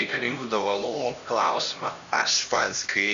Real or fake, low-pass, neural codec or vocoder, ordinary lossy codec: fake; 7.2 kHz; codec, 16 kHz, 1 kbps, X-Codec, HuBERT features, trained on LibriSpeech; Opus, 64 kbps